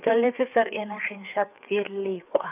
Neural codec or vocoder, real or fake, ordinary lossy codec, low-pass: codec, 16 kHz, 4 kbps, FreqCodec, larger model; fake; none; 3.6 kHz